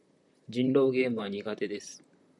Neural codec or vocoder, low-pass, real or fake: vocoder, 44.1 kHz, 128 mel bands, Pupu-Vocoder; 10.8 kHz; fake